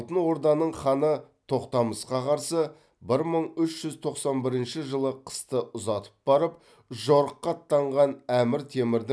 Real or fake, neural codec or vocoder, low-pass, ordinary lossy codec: real; none; none; none